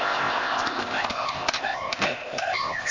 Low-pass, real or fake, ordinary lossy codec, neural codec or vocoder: 7.2 kHz; fake; MP3, 48 kbps; codec, 16 kHz, 0.8 kbps, ZipCodec